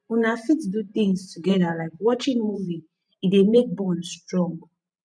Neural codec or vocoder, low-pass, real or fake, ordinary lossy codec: vocoder, 44.1 kHz, 128 mel bands every 512 samples, BigVGAN v2; 9.9 kHz; fake; none